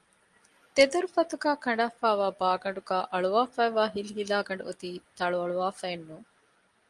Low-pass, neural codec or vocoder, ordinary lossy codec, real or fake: 10.8 kHz; none; Opus, 32 kbps; real